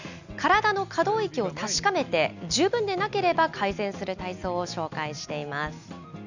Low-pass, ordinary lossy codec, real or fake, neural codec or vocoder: 7.2 kHz; none; real; none